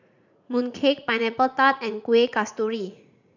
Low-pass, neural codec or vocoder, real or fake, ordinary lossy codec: 7.2 kHz; vocoder, 44.1 kHz, 80 mel bands, Vocos; fake; none